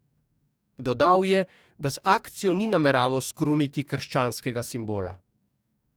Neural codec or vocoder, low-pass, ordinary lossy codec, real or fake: codec, 44.1 kHz, 2.6 kbps, DAC; none; none; fake